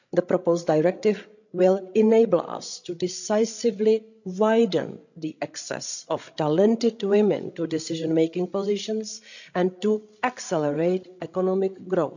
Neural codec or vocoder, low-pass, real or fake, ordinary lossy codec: codec, 16 kHz, 16 kbps, FreqCodec, larger model; 7.2 kHz; fake; none